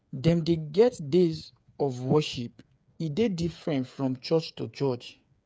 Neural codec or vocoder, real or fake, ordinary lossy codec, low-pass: codec, 16 kHz, 8 kbps, FreqCodec, smaller model; fake; none; none